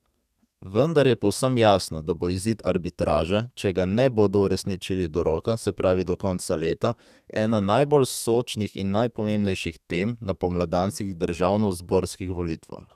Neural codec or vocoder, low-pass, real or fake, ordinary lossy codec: codec, 32 kHz, 1.9 kbps, SNAC; 14.4 kHz; fake; none